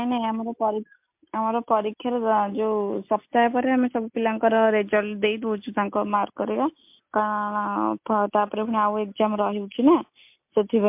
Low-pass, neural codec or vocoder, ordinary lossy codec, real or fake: 3.6 kHz; none; MP3, 32 kbps; real